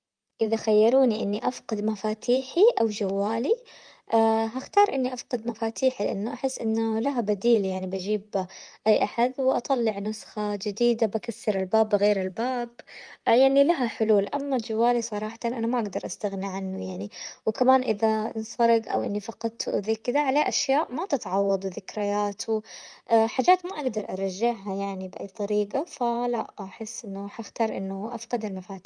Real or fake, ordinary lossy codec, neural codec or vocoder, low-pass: fake; Opus, 32 kbps; vocoder, 44.1 kHz, 128 mel bands, Pupu-Vocoder; 9.9 kHz